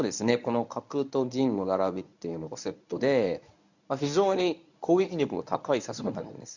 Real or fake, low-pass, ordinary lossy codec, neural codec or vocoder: fake; 7.2 kHz; none; codec, 24 kHz, 0.9 kbps, WavTokenizer, medium speech release version 1